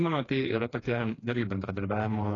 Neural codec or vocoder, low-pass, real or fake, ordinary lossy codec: codec, 16 kHz, 2 kbps, FreqCodec, smaller model; 7.2 kHz; fake; AAC, 32 kbps